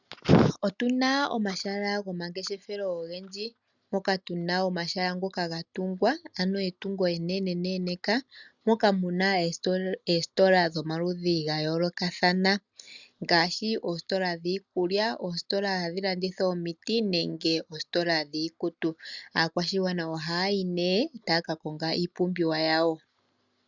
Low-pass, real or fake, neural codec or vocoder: 7.2 kHz; real; none